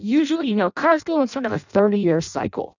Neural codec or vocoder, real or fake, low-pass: codec, 16 kHz in and 24 kHz out, 0.6 kbps, FireRedTTS-2 codec; fake; 7.2 kHz